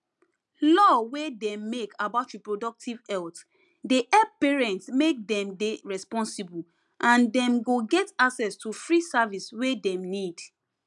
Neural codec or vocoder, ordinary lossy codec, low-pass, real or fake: none; none; 10.8 kHz; real